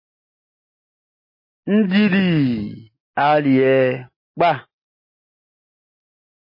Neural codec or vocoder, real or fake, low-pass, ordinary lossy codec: none; real; 5.4 kHz; MP3, 24 kbps